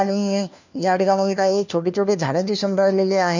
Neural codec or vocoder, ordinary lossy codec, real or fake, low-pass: codec, 16 kHz, 1 kbps, FunCodec, trained on LibriTTS, 50 frames a second; none; fake; 7.2 kHz